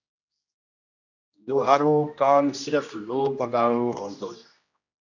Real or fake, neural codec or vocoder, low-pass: fake; codec, 16 kHz, 1 kbps, X-Codec, HuBERT features, trained on general audio; 7.2 kHz